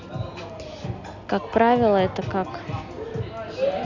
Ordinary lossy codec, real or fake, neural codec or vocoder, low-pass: none; real; none; 7.2 kHz